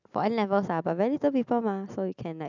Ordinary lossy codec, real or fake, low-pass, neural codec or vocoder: none; real; 7.2 kHz; none